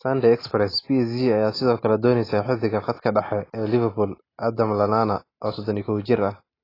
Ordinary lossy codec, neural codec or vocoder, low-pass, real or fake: AAC, 24 kbps; none; 5.4 kHz; real